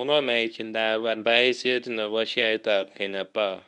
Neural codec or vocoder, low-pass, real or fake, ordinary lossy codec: codec, 24 kHz, 0.9 kbps, WavTokenizer, medium speech release version 1; 10.8 kHz; fake; none